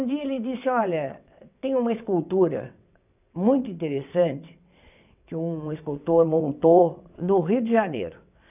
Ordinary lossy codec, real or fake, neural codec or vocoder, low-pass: none; fake; vocoder, 22.05 kHz, 80 mel bands, WaveNeXt; 3.6 kHz